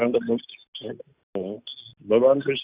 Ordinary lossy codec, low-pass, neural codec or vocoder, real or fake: Opus, 64 kbps; 3.6 kHz; none; real